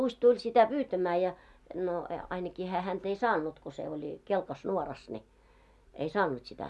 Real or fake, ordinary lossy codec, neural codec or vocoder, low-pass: real; none; none; none